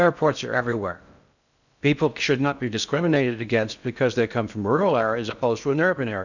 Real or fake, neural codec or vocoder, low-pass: fake; codec, 16 kHz in and 24 kHz out, 0.6 kbps, FocalCodec, streaming, 4096 codes; 7.2 kHz